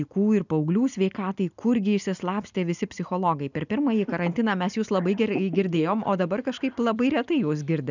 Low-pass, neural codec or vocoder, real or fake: 7.2 kHz; none; real